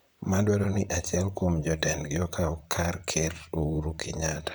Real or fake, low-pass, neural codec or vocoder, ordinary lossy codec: fake; none; vocoder, 44.1 kHz, 128 mel bands, Pupu-Vocoder; none